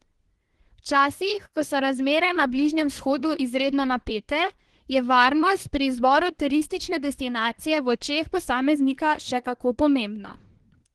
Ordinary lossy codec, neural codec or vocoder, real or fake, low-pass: Opus, 16 kbps; codec, 24 kHz, 1 kbps, SNAC; fake; 10.8 kHz